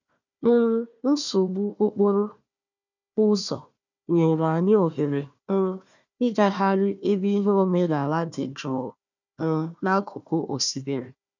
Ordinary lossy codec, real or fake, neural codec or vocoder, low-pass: none; fake; codec, 16 kHz, 1 kbps, FunCodec, trained on Chinese and English, 50 frames a second; 7.2 kHz